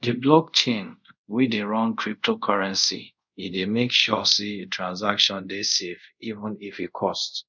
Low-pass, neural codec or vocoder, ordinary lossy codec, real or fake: 7.2 kHz; codec, 24 kHz, 0.5 kbps, DualCodec; none; fake